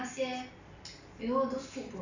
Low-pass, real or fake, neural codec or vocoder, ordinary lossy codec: 7.2 kHz; real; none; none